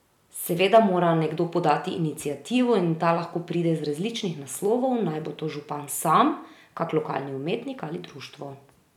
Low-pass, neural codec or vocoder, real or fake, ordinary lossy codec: 19.8 kHz; none; real; none